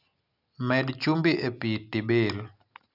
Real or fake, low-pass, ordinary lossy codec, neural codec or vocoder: real; 5.4 kHz; none; none